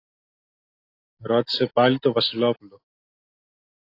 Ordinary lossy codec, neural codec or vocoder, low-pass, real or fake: AAC, 32 kbps; none; 5.4 kHz; real